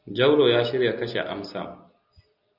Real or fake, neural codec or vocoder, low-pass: real; none; 5.4 kHz